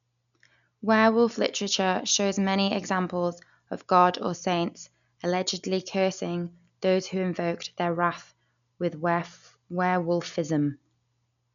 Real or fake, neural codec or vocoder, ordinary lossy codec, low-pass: real; none; none; 7.2 kHz